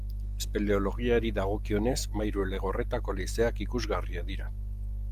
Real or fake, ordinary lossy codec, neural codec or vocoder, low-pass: real; Opus, 32 kbps; none; 14.4 kHz